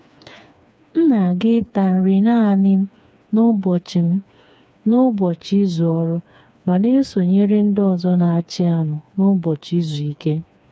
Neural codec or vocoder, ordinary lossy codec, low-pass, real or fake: codec, 16 kHz, 4 kbps, FreqCodec, smaller model; none; none; fake